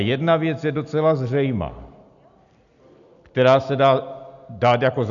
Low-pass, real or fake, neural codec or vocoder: 7.2 kHz; real; none